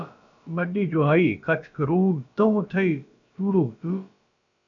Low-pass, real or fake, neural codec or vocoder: 7.2 kHz; fake; codec, 16 kHz, about 1 kbps, DyCAST, with the encoder's durations